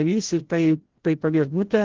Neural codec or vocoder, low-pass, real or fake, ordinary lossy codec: codec, 16 kHz, 0.5 kbps, FreqCodec, larger model; 7.2 kHz; fake; Opus, 16 kbps